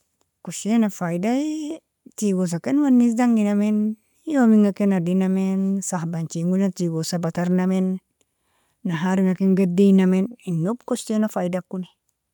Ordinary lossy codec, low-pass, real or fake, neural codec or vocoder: none; 19.8 kHz; real; none